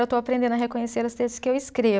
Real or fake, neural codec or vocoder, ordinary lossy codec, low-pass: real; none; none; none